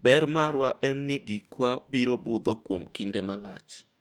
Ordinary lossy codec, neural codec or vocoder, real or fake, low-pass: none; codec, 44.1 kHz, 2.6 kbps, DAC; fake; 19.8 kHz